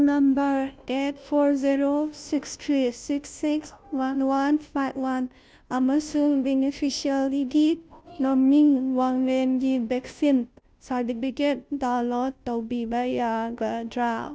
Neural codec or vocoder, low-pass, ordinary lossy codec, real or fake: codec, 16 kHz, 0.5 kbps, FunCodec, trained on Chinese and English, 25 frames a second; none; none; fake